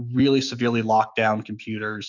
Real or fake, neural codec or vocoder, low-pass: fake; autoencoder, 48 kHz, 128 numbers a frame, DAC-VAE, trained on Japanese speech; 7.2 kHz